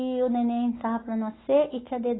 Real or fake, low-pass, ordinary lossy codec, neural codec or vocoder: real; 7.2 kHz; AAC, 16 kbps; none